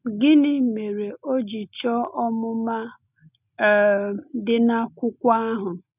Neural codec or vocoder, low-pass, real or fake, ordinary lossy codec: none; 3.6 kHz; real; none